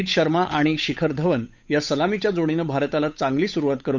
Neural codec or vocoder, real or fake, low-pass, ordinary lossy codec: codec, 16 kHz, 8 kbps, FunCodec, trained on Chinese and English, 25 frames a second; fake; 7.2 kHz; none